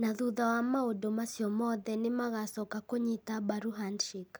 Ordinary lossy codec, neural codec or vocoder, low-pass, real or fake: none; none; none; real